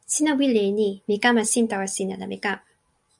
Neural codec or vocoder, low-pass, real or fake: none; 10.8 kHz; real